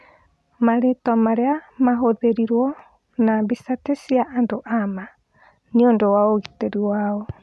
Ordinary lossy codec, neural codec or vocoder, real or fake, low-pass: none; none; real; none